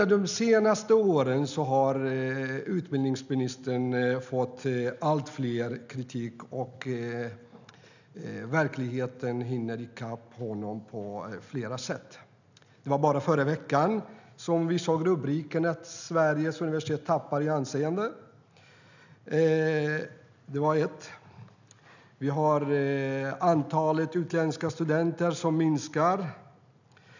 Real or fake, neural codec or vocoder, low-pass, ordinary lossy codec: real; none; 7.2 kHz; none